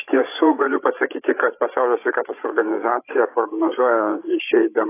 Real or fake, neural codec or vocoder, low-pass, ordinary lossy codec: fake; codec, 16 kHz, 16 kbps, FreqCodec, larger model; 3.6 kHz; AAC, 24 kbps